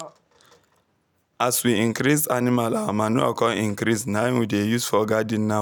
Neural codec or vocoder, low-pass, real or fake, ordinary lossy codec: none; none; real; none